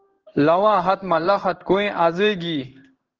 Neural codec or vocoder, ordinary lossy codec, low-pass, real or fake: codec, 16 kHz in and 24 kHz out, 1 kbps, XY-Tokenizer; Opus, 16 kbps; 7.2 kHz; fake